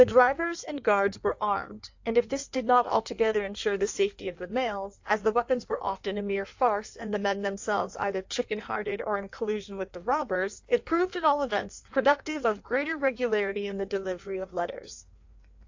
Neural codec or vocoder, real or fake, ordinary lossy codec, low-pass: codec, 16 kHz in and 24 kHz out, 1.1 kbps, FireRedTTS-2 codec; fake; AAC, 48 kbps; 7.2 kHz